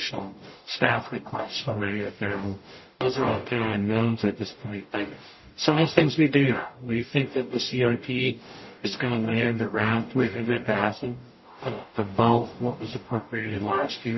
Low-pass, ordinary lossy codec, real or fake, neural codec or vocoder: 7.2 kHz; MP3, 24 kbps; fake; codec, 44.1 kHz, 0.9 kbps, DAC